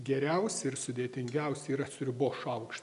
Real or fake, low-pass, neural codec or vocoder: real; 10.8 kHz; none